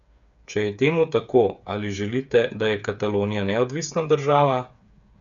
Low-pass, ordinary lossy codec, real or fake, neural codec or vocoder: 7.2 kHz; none; fake; codec, 16 kHz, 8 kbps, FreqCodec, smaller model